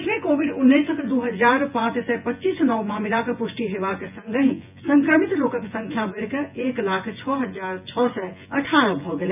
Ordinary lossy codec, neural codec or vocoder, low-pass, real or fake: none; vocoder, 24 kHz, 100 mel bands, Vocos; 3.6 kHz; fake